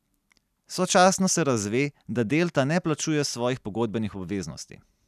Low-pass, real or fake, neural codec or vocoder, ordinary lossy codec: 14.4 kHz; real; none; none